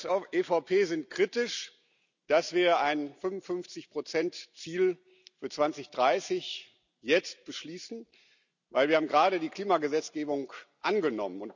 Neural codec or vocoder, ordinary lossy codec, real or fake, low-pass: none; none; real; 7.2 kHz